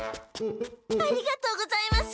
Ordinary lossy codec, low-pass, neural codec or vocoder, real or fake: none; none; none; real